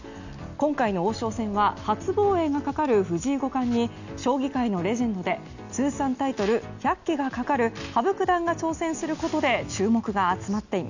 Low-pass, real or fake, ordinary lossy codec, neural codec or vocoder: 7.2 kHz; real; none; none